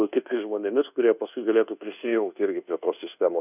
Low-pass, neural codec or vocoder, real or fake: 3.6 kHz; codec, 24 kHz, 1.2 kbps, DualCodec; fake